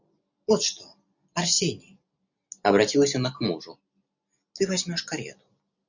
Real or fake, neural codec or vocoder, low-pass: real; none; 7.2 kHz